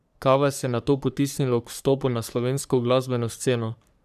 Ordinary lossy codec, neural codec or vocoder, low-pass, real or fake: none; codec, 44.1 kHz, 3.4 kbps, Pupu-Codec; 14.4 kHz; fake